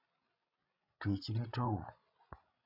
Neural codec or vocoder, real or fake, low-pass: codec, 16 kHz, 16 kbps, FreqCodec, larger model; fake; 5.4 kHz